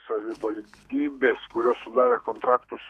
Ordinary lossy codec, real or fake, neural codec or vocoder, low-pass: AAC, 96 kbps; fake; codec, 32 kHz, 1.9 kbps, SNAC; 14.4 kHz